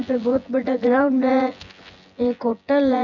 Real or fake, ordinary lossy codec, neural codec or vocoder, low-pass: fake; none; vocoder, 24 kHz, 100 mel bands, Vocos; 7.2 kHz